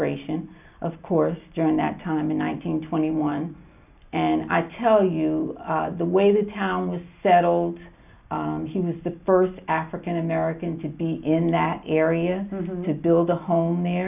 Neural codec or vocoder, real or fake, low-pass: none; real; 3.6 kHz